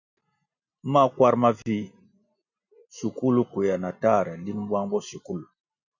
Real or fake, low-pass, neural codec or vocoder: real; 7.2 kHz; none